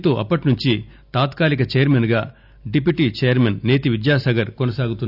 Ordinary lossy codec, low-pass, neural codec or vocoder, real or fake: none; 5.4 kHz; none; real